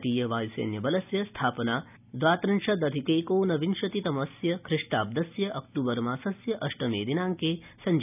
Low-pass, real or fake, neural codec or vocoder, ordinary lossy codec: 3.6 kHz; real; none; none